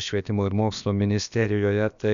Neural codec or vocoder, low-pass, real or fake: codec, 16 kHz, about 1 kbps, DyCAST, with the encoder's durations; 7.2 kHz; fake